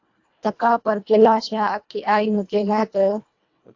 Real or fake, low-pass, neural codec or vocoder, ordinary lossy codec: fake; 7.2 kHz; codec, 24 kHz, 1.5 kbps, HILCodec; AAC, 48 kbps